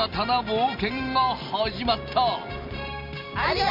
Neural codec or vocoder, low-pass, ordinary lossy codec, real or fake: none; 5.4 kHz; MP3, 48 kbps; real